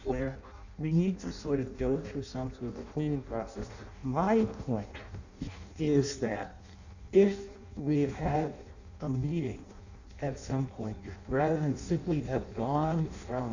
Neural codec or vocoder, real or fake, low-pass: codec, 16 kHz in and 24 kHz out, 0.6 kbps, FireRedTTS-2 codec; fake; 7.2 kHz